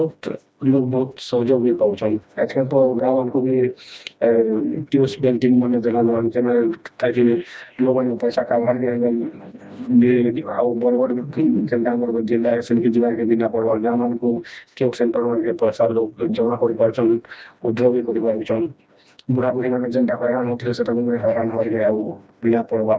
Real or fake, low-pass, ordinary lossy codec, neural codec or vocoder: fake; none; none; codec, 16 kHz, 1 kbps, FreqCodec, smaller model